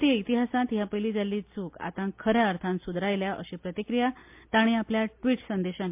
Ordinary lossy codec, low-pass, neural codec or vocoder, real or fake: MP3, 32 kbps; 3.6 kHz; none; real